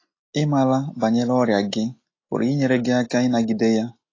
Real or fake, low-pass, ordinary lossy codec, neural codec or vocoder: real; 7.2 kHz; AAC, 32 kbps; none